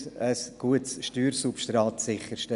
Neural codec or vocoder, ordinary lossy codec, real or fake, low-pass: none; none; real; 10.8 kHz